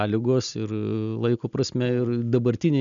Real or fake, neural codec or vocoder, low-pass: real; none; 7.2 kHz